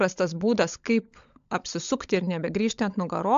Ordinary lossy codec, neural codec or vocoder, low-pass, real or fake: AAC, 64 kbps; codec, 16 kHz, 16 kbps, FunCodec, trained on LibriTTS, 50 frames a second; 7.2 kHz; fake